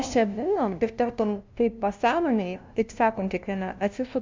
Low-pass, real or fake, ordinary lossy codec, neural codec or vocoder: 7.2 kHz; fake; MP3, 64 kbps; codec, 16 kHz, 0.5 kbps, FunCodec, trained on LibriTTS, 25 frames a second